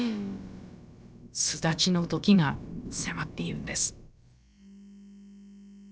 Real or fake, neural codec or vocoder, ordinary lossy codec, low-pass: fake; codec, 16 kHz, about 1 kbps, DyCAST, with the encoder's durations; none; none